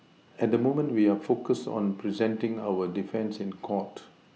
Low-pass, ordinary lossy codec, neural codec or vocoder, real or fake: none; none; none; real